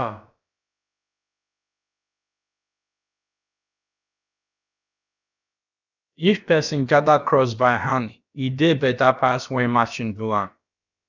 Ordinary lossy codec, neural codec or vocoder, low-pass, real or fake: none; codec, 16 kHz, about 1 kbps, DyCAST, with the encoder's durations; 7.2 kHz; fake